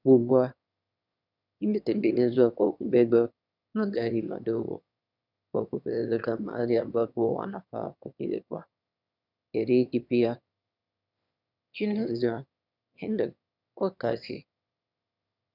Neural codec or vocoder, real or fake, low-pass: autoencoder, 22.05 kHz, a latent of 192 numbers a frame, VITS, trained on one speaker; fake; 5.4 kHz